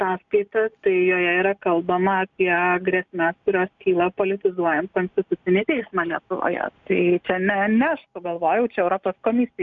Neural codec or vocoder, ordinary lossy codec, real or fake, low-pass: none; MP3, 96 kbps; real; 7.2 kHz